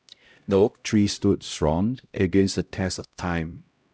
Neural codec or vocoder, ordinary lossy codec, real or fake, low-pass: codec, 16 kHz, 0.5 kbps, X-Codec, HuBERT features, trained on LibriSpeech; none; fake; none